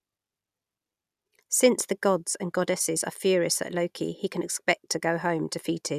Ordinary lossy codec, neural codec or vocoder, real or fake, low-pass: none; none; real; 14.4 kHz